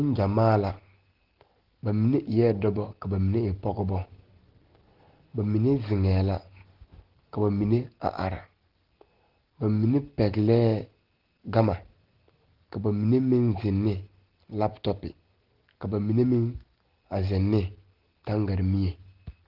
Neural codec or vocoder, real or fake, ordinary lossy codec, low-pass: none; real; Opus, 16 kbps; 5.4 kHz